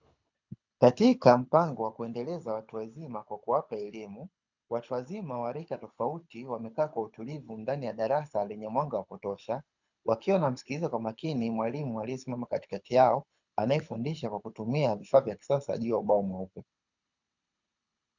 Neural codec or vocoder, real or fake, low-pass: codec, 24 kHz, 6 kbps, HILCodec; fake; 7.2 kHz